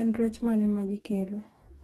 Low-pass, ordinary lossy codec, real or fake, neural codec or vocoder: 19.8 kHz; AAC, 32 kbps; fake; codec, 44.1 kHz, 2.6 kbps, DAC